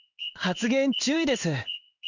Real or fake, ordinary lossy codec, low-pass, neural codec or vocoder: fake; none; 7.2 kHz; codec, 16 kHz in and 24 kHz out, 1 kbps, XY-Tokenizer